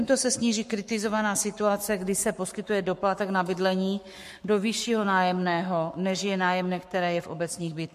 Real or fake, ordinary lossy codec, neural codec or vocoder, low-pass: fake; MP3, 64 kbps; codec, 44.1 kHz, 7.8 kbps, Pupu-Codec; 14.4 kHz